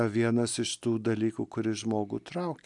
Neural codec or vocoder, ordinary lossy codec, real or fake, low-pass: none; MP3, 96 kbps; real; 10.8 kHz